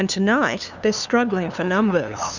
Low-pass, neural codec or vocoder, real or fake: 7.2 kHz; codec, 16 kHz, 2 kbps, FunCodec, trained on LibriTTS, 25 frames a second; fake